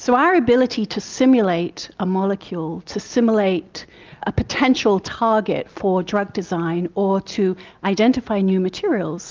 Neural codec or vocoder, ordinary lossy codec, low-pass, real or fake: none; Opus, 32 kbps; 7.2 kHz; real